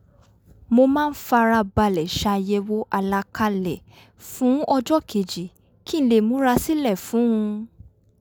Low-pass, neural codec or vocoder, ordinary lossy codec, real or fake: none; none; none; real